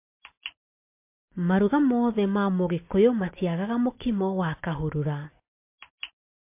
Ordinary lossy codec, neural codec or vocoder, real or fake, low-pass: MP3, 24 kbps; none; real; 3.6 kHz